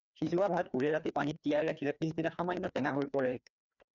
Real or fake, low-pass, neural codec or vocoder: fake; 7.2 kHz; codec, 16 kHz, 2 kbps, FreqCodec, larger model